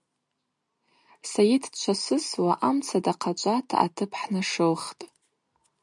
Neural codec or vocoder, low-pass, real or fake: none; 10.8 kHz; real